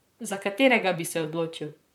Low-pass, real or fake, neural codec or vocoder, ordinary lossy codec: 19.8 kHz; fake; vocoder, 44.1 kHz, 128 mel bands, Pupu-Vocoder; none